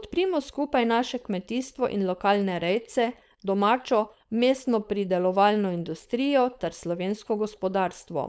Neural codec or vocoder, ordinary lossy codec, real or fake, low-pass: codec, 16 kHz, 4.8 kbps, FACodec; none; fake; none